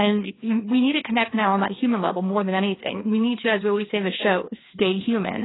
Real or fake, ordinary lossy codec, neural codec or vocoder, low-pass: fake; AAC, 16 kbps; codec, 16 kHz, 2 kbps, FreqCodec, larger model; 7.2 kHz